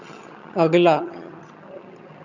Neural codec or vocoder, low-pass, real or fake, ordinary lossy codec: vocoder, 22.05 kHz, 80 mel bands, HiFi-GAN; 7.2 kHz; fake; none